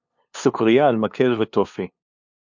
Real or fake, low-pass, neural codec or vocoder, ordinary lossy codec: fake; 7.2 kHz; codec, 16 kHz, 2 kbps, FunCodec, trained on LibriTTS, 25 frames a second; MP3, 64 kbps